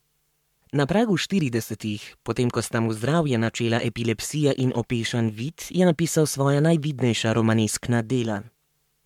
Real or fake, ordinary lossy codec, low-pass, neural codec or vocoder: fake; MP3, 96 kbps; 19.8 kHz; codec, 44.1 kHz, 7.8 kbps, Pupu-Codec